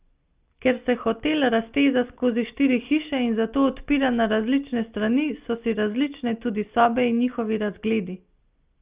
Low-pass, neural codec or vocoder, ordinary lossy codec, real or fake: 3.6 kHz; none; Opus, 32 kbps; real